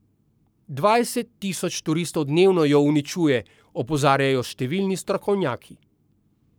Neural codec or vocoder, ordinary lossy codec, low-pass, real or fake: codec, 44.1 kHz, 7.8 kbps, Pupu-Codec; none; none; fake